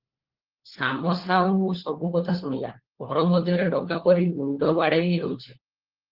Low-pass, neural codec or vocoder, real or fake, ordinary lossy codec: 5.4 kHz; codec, 16 kHz, 4 kbps, FunCodec, trained on LibriTTS, 50 frames a second; fake; Opus, 16 kbps